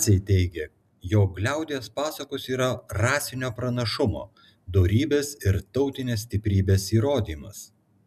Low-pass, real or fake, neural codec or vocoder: 14.4 kHz; real; none